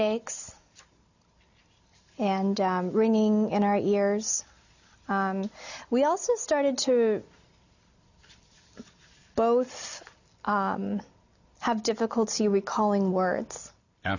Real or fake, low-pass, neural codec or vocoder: real; 7.2 kHz; none